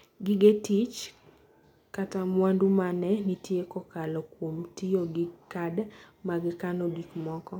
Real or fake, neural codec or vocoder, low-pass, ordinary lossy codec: real; none; 19.8 kHz; none